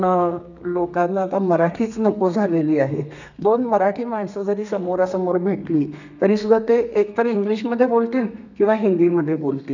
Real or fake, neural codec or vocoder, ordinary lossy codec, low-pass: fake; codec, 32 kHz, 1.9 kbps, SNAC; none; 7.2 kHz